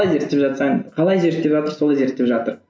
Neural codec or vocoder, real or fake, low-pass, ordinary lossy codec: none; real; none; none